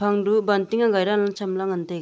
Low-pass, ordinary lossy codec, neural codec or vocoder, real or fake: none; none; none; real